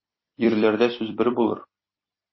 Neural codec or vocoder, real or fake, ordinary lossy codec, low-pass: vocoder, 44.1 kHz, 128 mel bands every 256 samples, BigVGAN v2; fake; MP3, 24 kbps; 7.2 kHz